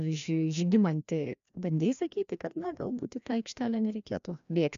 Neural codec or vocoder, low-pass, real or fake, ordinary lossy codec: codec, 16 kHz, 1 kbps, FreqCodec, larger model; 7.2 kHz; fake; MP3, 96 kbps